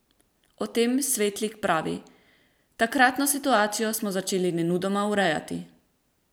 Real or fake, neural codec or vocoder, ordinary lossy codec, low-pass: fake; vocoder, 44.1 kHz, 128 mel bands every 512 samples, BigVGAN v2; none; none